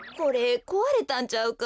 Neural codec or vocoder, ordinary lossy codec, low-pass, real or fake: none; none; none; real